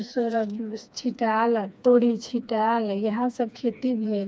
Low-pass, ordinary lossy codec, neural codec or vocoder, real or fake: none; none; codec, 16 kHz, 2 kbps, FreqCodec, smaller model; fake